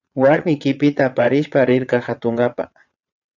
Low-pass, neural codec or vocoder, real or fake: 7.2 kHz; vocoder, 22.05 kHz, 80 mel bands, WaveNeXt; fake